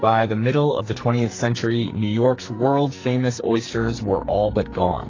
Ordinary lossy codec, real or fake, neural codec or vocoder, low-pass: AAC, 32 kbps; fake; codec, 44.1 kHz, 2.6 kbps, SNAC; 7.2 kHz